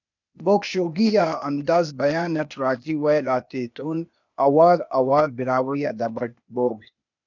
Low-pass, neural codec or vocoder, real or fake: 7.2 kHz; codec, 16 kHz, 0.8 kbps, ZipCodec; fake